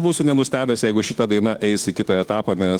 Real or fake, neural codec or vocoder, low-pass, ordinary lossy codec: fake; autoencoder, 48 kHz, 32 numbers a frame, DAC-VAE, trained on Japanese speech; 19.8 kHz; Opus, 24 kbps